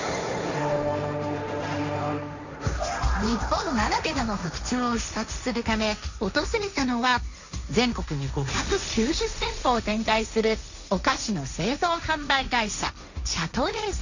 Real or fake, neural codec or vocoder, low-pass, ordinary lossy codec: fake; codec, 16 kHz, 1.1 kbps, Voila-Tokenizer; 7.2 kHz; none